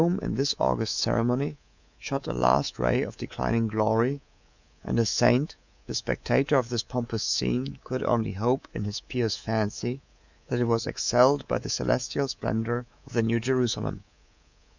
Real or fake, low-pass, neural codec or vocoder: fake; 7.2 kHz; codec, 24 kHz, 3.1 kbps, DualCodec